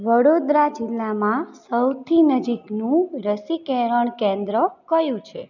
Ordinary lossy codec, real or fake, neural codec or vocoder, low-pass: none; real; none; 7.2 kHz